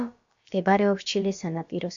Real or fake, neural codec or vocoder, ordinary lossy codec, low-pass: fake; codec, 16 kHz, about 1 kbps, DyCAST, with the encoder's durations; AAC, 64 kbps; 7.2 kHz